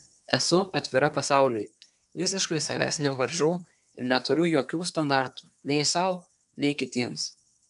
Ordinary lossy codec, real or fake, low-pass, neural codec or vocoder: MP3, 96 kbps; fake; 10.8 kHz; codec, 24 kHz, 1 kbps, SNAC